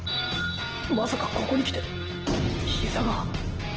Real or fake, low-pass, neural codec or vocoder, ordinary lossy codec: real; 7.2 kHz; none; Opus, 16 kbps